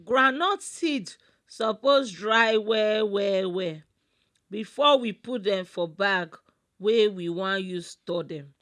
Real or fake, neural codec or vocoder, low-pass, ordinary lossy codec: real; none; none; none